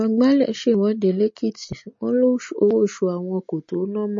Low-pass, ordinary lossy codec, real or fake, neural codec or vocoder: 7.2 kHz; MP3, 32 kbps; real; none